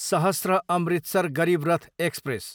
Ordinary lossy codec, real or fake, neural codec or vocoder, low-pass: none; real; none; none